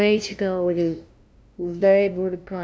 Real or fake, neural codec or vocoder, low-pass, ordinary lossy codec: fake; codec, 16 kHz, 0.5 kbps, FunCodec, trained on LibriTTS, 25 frames a second; none; none